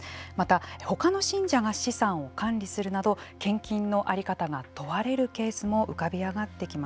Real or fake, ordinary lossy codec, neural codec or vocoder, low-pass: real; none; none; none